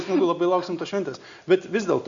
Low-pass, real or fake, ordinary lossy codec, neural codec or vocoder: 7.2 kHz; real; Opus, 64 kbps; none